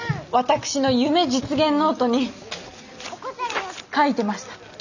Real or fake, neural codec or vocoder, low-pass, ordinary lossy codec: real; none; 7.2 kHz; none